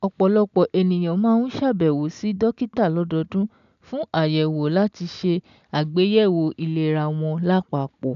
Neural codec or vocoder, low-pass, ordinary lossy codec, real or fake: none; 7.2 kHz; none; real